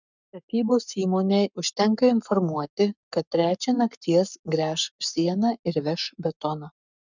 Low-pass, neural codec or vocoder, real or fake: 7.2 kHz; codec, 44.1 kHz, 7.8 kbps, Pupu-Codec; fake